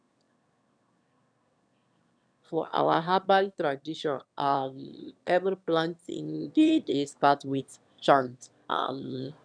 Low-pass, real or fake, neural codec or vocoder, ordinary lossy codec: 9.9 kHz; fake; autoencoder, 22.05 kHz, a latent of 192 numbers a frame, VITS, trained on one speaker; none